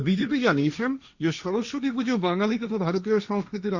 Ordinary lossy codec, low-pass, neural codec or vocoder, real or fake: Opus, 64 kbps; 7.2 kHz; codec, 16 kHz, 1.1 kbps, Voila-Tokenizer; fake